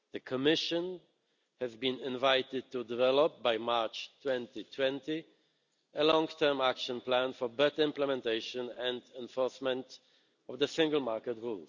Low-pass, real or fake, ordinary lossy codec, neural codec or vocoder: 7.2 kHz; real; none; none